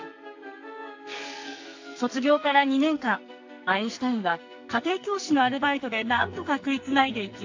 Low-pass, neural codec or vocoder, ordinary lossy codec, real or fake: 7.2 kHz; codec, 44.1 kHz, 2.6 kbps, SNAC; AAC, 48 kbps; fake